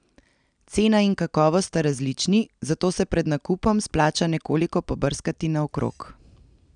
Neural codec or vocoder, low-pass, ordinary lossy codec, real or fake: none; 9.9 kHz; none; real